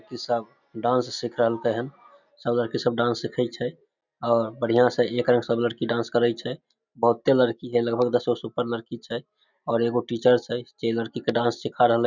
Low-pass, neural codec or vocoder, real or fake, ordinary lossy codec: 7.2 kHz; none; real; none